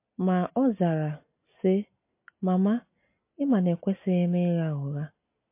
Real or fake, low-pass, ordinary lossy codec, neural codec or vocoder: real; 3.6 kHz; MP3, 24 kbps; none